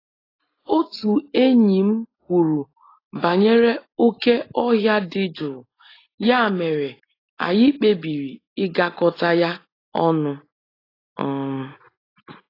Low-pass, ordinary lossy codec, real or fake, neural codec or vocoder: 5.4 kHz; AAC, 24 kbps; real; none